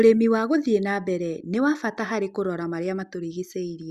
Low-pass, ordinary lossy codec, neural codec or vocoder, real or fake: 14.4 kHz; Opus, 64 kbps; none; real